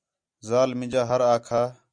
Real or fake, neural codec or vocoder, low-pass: real; none; 9.9 kHz